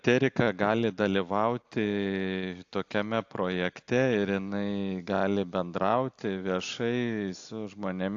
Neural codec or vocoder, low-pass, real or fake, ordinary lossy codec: none; 7.2 kHz; real; AAC, 64 kbps